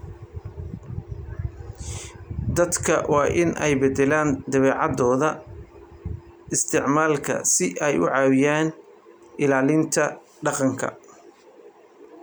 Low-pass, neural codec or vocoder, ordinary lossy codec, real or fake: none; none; none; real